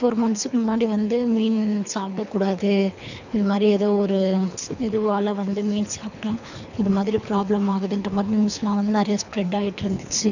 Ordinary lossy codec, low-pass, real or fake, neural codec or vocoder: none; 7.2 kHz; fake; codec, 24 kHz, 3 kbps, HILCodec